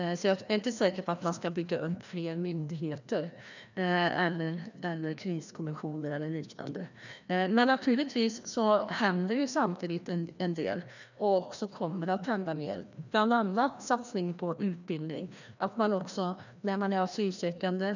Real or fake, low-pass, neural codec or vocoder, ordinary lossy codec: fake; 7.2 kHz; codec, 16 kHz, 1 kbps, FreqCodec, larger model; none